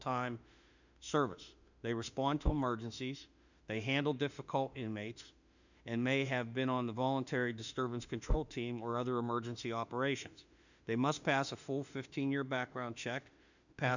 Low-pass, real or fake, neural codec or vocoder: 7.2 kHz; fake; autoencoder, 48 kHz, 32 numbers a frame, DAC-VAE, trained on Japanese speech